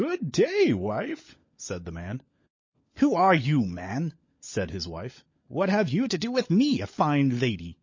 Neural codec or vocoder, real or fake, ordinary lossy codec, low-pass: codec, 16 kHz, 16 kbps, FunCodec, trained on LibriTTS, 50 frames a second; fake; MP3, 32 kbps; 7.2 kHz